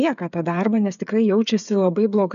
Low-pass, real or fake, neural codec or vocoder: 7.2 kHz; fake; codec, 16 kHz, 8 kbps, FreqCodec, smaller model